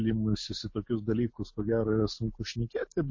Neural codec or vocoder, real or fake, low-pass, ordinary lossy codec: none; real; 7.2 kHz; MP3, 32 kbps